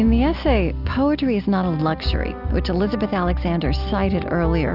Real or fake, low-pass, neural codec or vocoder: real; 5.4 kHz; none